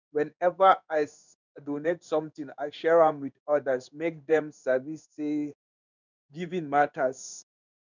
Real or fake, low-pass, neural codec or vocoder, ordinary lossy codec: fake; 7.2 kHz; codec, 16 kHz in and 24 kHz out, 1 kbps, XY-Tokenizer; none